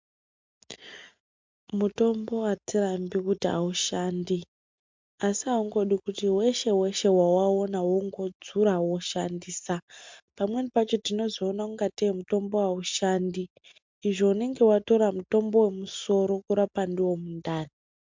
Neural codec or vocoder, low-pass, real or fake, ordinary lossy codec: none; 7.2 kHz; real; MP3, 64 kbps